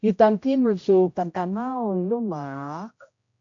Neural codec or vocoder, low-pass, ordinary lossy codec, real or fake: codec, 16 kHz, 0.5 kbps, X-Codec, HuBERT features, trained on general audio; 7.2 kHz; AAC, 48 kbps; fake